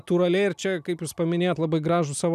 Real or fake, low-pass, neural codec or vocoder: real; 14.4 kHz; none